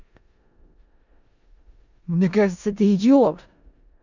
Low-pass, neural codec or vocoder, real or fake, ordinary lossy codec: 7.2 kHz; codec, 16 kHz in and 24 kHz out, 0.4 kbps, LongCat-Audio-Codec, four codebook decoder; fake; none